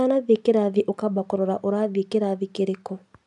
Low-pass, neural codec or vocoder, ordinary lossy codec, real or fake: 10.8 kHz; none; none; real